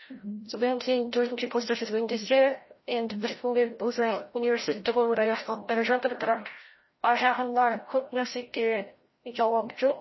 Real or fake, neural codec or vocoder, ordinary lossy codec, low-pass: fake; codec, 16 kHz, 0.5 kbps, FreqCodec, larger model; MP3, 24 kbps; 7.2 kHz